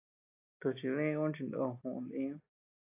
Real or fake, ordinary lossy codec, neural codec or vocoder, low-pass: real; AAC, 24 kbps; none; 3.6 kHz